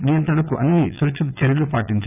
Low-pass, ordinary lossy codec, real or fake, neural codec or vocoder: 3.6 kHz; none; fake; vocoder, 22.05 kHz, 80 mel bands, Vocos